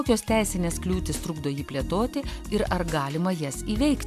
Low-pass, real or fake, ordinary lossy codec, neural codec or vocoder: 14.4 kHz; real; AAC, 96 kbps; none